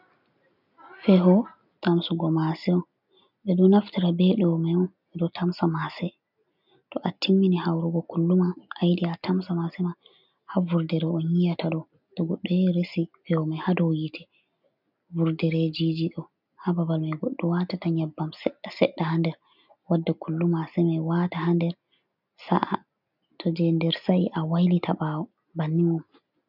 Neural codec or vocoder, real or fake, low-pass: none; real; 5.4 kHz